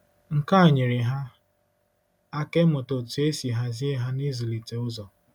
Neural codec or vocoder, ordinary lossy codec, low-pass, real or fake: none; none; 19.8 kHz; real